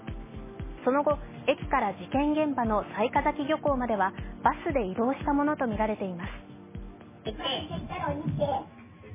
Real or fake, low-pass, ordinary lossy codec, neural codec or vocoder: real; 3.6 kHz; MP3, 16 kbps; none